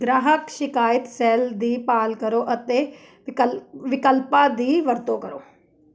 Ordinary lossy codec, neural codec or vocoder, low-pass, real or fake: none; none; none; real